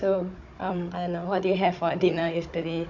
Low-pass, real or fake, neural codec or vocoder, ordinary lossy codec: 7.2 kHz; fake; codec, 16 kHz, 16 kbps, FunCodec, trained on Chinese and English, 50 frames a second; AAC, 48 kbps